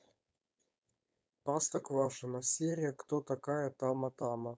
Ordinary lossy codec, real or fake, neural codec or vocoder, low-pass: none; fake; codec, 16 kHz, 4.8 kbps, FACodec; none